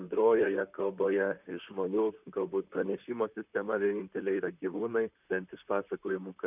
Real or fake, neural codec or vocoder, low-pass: fake; vocoder, 44.1 kHz, 128 mel bands, Pupu-Vocoder; 3.6 kHz